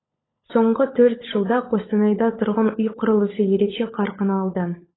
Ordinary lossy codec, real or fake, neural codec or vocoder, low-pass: AAC, 16 kbps; fake; codec, 16 kHz, 16 kbps, FunCodec, trained on LibriTTS, 50 frames a second; 7.2 kHz